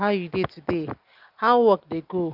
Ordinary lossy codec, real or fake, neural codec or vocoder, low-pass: Opus, 24 kbps; real; none; 5.4 kHz